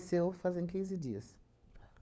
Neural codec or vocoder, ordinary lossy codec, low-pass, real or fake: codec, 16 kHz, 4 kbps, FunCodec, trained on LibriTTS, 50 frames a second; none; none; fake